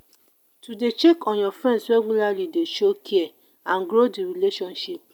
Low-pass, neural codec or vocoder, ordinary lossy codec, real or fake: 19.8 kHz; none; none; real